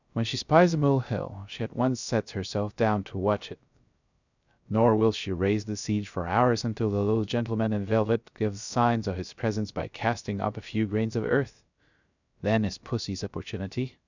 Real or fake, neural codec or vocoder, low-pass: fake; codec, 16 kHz, 0.3 kbps, FocalCodec; 7.2 kHz